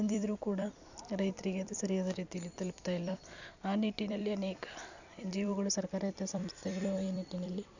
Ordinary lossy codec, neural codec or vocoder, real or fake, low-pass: none; vocoder, 44.1 kHz, 128 mel bands every 256 samples, BigVGAN v2; fake; 7.2 kHz